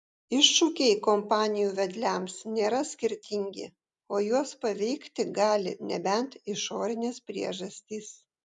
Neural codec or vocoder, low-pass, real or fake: none; 10.8 kHz; real